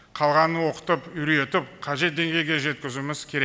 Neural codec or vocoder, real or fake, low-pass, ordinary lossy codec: none; real; none; none